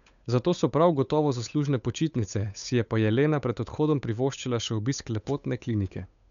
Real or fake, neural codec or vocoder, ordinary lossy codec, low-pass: fake; codec, 16 kHz, 8 kbps, FunCodec, trained on Chinese and English, 25 frames a second; none; 7.2 kHz